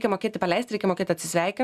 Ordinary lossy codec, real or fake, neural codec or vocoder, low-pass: Opus, 64 kbps; real; none; 14.4 kHz